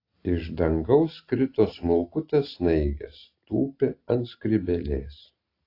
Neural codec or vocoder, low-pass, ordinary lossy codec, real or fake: none; 5.4 kHz; AAC, 32 kbps; real